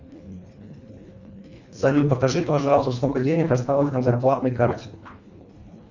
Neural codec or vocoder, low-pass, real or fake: codec, 24 kHz, 1.5 kbps, HILCodec; 7.2 kHz; fake